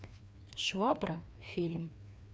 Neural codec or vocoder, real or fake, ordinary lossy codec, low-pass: codec, 16 kHz, 2 kbps, FreqCodec, larger model; fake; none; none